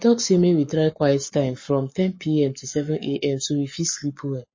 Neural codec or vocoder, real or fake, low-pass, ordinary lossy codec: codec, 44.1 kHz, 7.8 kbps, Pupu-Codec; fake; 7.2 kHz; MP3, 32 kbps